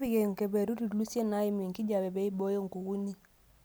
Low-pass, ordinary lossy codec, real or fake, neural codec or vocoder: none; none; real; none